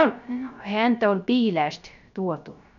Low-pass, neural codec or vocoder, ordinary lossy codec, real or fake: 7.2 kHz; codec, 16 kHz, 0.3 kbps, FocalCodec; none; fake